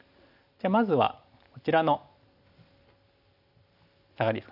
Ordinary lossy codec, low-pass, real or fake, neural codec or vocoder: none; 5.4 kHz; real; none